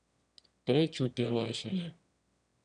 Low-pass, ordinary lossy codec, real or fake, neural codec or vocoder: 9.9 kHz; none; fake; autoencoder, 22.05 kHz, a latent of 192 numbers a frame, VITS, trained on one speaker